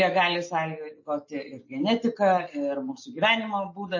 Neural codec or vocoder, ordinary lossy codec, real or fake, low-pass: none; MP3, 32 kbps; real; 7.2 kHz